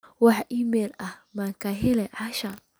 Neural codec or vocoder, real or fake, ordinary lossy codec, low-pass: none; real; none; none